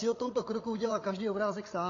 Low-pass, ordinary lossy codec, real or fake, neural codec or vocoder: 7.2 kHz; AAC, 32 kbps; fake; codec, 16 kHz, 8 kbps, FreqCodec, larger model